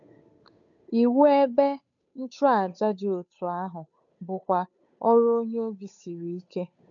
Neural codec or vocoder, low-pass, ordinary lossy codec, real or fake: codec, 16 kHz, 8 kbps, FunCodec, trained on Chinese and English, 25 frames a second; 7.2 kHz; none; fake